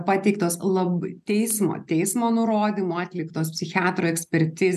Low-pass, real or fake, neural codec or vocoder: 14.4 kHz; real; none